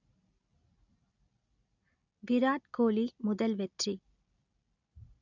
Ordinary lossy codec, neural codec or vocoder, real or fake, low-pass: none; none; real; 7.2 kHz